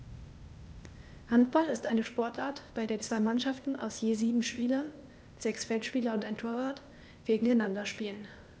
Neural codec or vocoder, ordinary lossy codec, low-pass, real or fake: codec, 16 kHz, 0.8 kbps, ZipCodec; none; none; fake